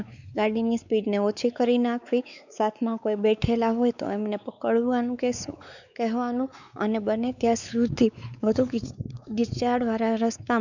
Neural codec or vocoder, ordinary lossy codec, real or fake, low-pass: codec, 16 kHz, 4 kbps, X-Codec, WavLM features, trained on Multilingual LibriSpeech; none; fake; 7.2 kHz